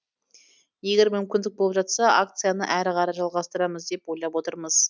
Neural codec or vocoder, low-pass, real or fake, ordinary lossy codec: none; none; real; none